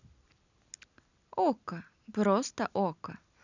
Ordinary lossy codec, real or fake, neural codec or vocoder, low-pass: none; real; none; 7.2 kHz